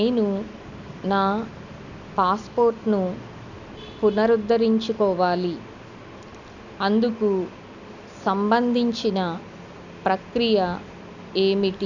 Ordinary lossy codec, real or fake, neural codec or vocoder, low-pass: none; real; none; 7.2 kHz